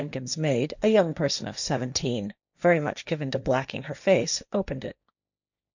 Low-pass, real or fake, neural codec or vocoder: 7.2 kHz; fake; codec, 16 kHz, 1.1 kbps, Voila-Tokenizer